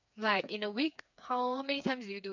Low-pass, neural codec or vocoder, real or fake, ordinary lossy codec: 7.2 kHz; codec, 16 kHz, 4 kbps, FreqCodec, smaller model; fake; none